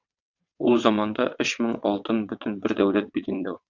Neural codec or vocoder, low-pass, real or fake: vocoder, 22.05 kHz, 80 mel bands, WaveNeXt; 7.2 kHz; fake